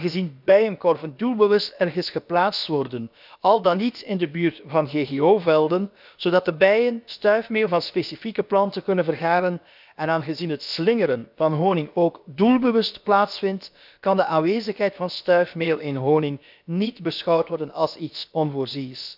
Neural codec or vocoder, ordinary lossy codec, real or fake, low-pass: codec, 16 kHz, about 1 kbps, DyCAST, with the encoder's durations; none; fake; 5.4 kHz